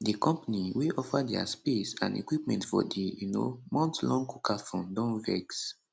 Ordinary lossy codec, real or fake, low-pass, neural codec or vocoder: none; real; none; none